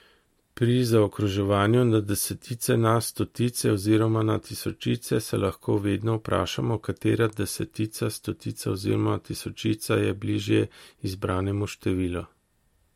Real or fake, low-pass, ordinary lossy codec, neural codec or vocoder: real; 19.8 kHz; MP3, 64 kbps; none